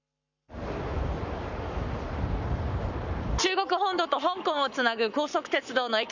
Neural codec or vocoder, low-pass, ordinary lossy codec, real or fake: codec, 44.1 kHz, 7.8 kbps, Pupu-Codec; 7.2 kHz; none; fake